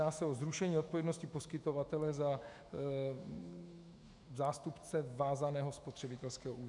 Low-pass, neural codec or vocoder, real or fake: 10.8 kHz; autoencoder, 48 kHz, 128 numbers a frame, DAC-VAE, trained on Japanese speech; fake